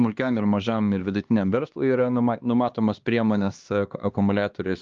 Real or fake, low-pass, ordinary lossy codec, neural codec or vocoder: fake; 7.2 kHz; Opus, 32 kbps; codec, 16 kHz, 2 kbps, X-Codec, HuBERT features, trained on LibriSpeech